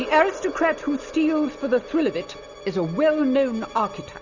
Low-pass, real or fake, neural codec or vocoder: 7.2 kHz; real; none